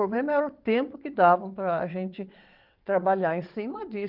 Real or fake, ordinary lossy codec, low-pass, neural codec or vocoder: fake; Opus, 32 kbps; 5.4 kHz; vocoder, 22.05 kHz, 80 mel bands, WaveNeXt